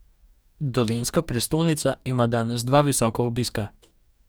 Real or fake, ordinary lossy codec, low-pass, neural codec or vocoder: fake; none; none; codec, 44.1 kHz, 2.6 kbps, DAC